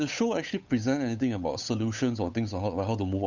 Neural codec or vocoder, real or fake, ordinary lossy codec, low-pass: codec, 16 kHz, 8 kbps, FunCodec, trained on Chinese and English, 25 frames a second; fake; none; 7.2 kHz